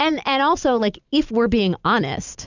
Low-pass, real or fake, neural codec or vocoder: 7.2 kHz; fake; vocoder, 44.1 kHz, 128 mel bands every 256 samples, BigVGAN v2